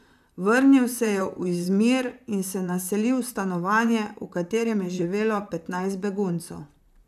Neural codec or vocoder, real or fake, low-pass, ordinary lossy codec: vocoder, 44.1 kHz, 128 mel bands, Pupu-Vocoder; fake; 14.4 kHz; none